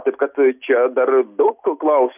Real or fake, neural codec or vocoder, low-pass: real; none; 3.6 kHz